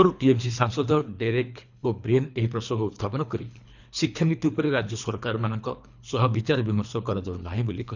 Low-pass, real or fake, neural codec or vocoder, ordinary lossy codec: 7.2 kHz; fake; codec, 24 kHz, 3 kbps, HILCodec; none